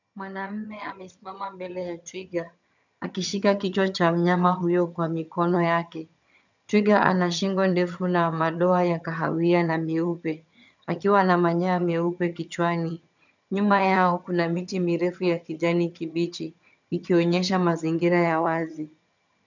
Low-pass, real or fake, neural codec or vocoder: 7.2 kHz; fake; vocoder, 22.05 kHz, 80 mel bands, HiFi-GAN